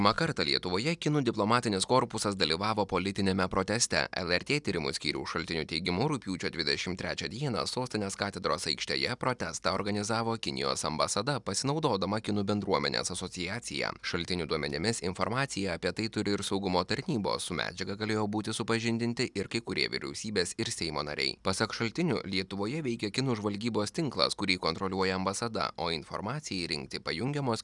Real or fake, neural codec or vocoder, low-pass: real; none; 10.8 kHz